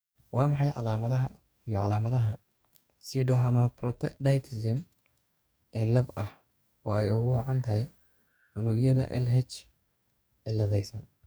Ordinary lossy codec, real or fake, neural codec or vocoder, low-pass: none; fake; codec, 44.1 kHz, 2.6 kbps, DAC; none